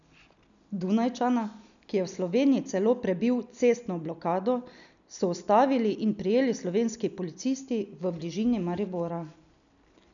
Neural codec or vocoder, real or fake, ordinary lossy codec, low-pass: none; real; none; 7.2 kHz